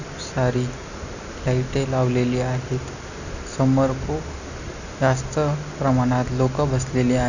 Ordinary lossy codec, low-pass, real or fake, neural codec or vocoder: none; 7.2 kHz; real; none